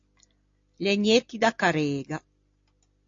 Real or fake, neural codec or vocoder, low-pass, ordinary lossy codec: real; none; 7.2 kHz; AAC, 48 kbps